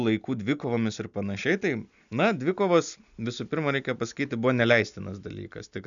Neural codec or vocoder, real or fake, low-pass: none; real; 7.2 kHz